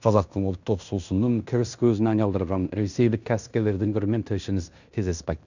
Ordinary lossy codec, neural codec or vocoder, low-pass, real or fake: none; codec, 16 kHz in and 24 kHz out, 0.9 kbps, LongCat-Audio-Codec, fine tuned four codebook decoder; 7.2 kHz; fake